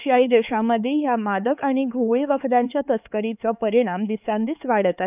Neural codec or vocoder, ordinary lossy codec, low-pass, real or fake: codec, 16 kHz, 4 kbps, X-Codec, HuBERT features, trained on LibriSpeech; none; 3.6 kHz; fake